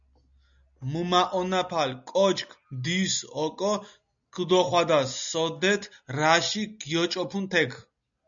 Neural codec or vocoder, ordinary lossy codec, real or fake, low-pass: none; MP3, 96 kbps; real; 7.2 kHz